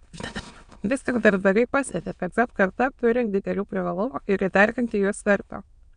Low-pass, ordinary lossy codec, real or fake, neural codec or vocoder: 9.9 kHz; MP3, 96 kbps; fake; autoencoder, 22.05 kHz, a latent of 192 numbers a frame, VITS, trained on many speakers